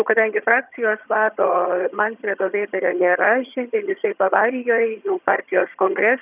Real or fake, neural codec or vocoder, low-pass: fake; vocoder, 22.05 kHz, 80 mel bands, HiFi-GAN; 3.6 kHz